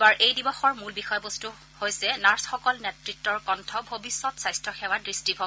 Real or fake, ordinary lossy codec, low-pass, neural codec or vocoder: real; none; none; none